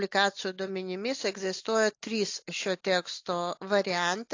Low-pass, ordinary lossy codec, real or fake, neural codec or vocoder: 7.2 kHz; AAC, 48 kbps; real; none